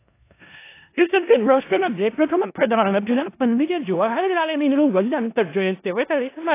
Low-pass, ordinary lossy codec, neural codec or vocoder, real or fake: 3.6 kHz; AAC, 24 kbps; codec, 16 kHz in and 24 kHz out, 0.4 kbps, LongCat-Audio-Codec, four codebook decoder; fake